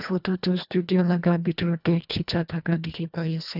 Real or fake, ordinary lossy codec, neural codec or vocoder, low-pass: fake; none; codec, 24 kHz, 1.5 kbps, HILCodec; 5.4 kHz